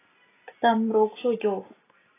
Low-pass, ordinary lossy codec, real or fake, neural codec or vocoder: 3.6 kHz; AAC, 16 kbps; real; none